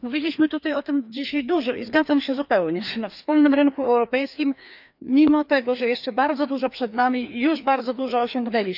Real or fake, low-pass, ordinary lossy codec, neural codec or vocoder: fake; 5.4 kHz; none; codec, 16 kHz, 2 kbps, FreqCodec, larger model